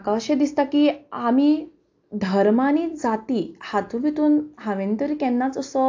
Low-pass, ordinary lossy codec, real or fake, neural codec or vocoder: 7.2 kHz; AAC, 48 kbps; real; none